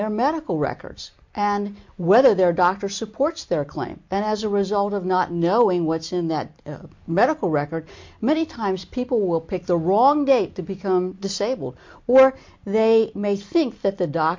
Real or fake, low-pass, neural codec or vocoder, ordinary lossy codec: real; 7.2 kHz; none; MP3, 48 kbps